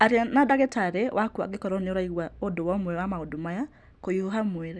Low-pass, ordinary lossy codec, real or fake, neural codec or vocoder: none; none; real; none